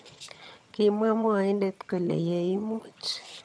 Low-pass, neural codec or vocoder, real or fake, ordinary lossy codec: none; vocoder, 22.05 kHz, 80 mel bands, HiFi-GAN; fake; none